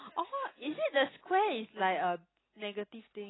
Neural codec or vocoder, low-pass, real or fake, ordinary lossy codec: none; 7.2 kHz; real; AAC, 16 kbps